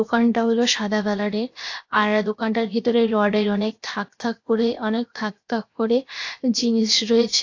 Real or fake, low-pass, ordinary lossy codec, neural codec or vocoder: fake; 7.2 kHz; AAC, 48 kbps; codec, 16 kHz, 0.7 kbps, FocalCodec